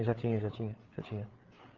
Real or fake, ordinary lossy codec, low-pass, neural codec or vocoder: fake; Opus, 16 kbps; 7.2 kHz; codec, 16 kHz, 8 kbps, FreqCodec, larger model